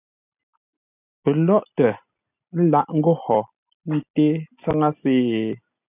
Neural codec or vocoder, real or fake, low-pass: none; real; 3.6 kHz